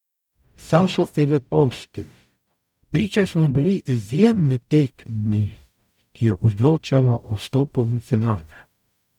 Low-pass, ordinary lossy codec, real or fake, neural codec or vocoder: 19.8 kHz; none; fake; codec, 44.1 kHz, 0.9 kbps, DAC